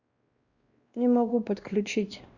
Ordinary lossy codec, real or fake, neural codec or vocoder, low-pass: Opus, 64 kbps; fake; codec, 16 kHz, 1 kbps, X-Codec, WavLM features, trained on Multilingual LibriSpeech; 7.2 kHz